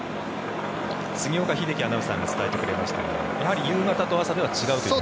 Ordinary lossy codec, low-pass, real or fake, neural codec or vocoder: none; none; real; none